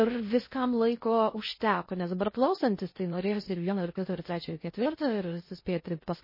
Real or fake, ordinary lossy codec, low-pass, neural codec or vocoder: fake; MP3, 24 kbps; 5.4 kHz; codec, 16 kHz in and 24 kHz out, 0.6 kbps, FocalCodec, streaming, 2048 codes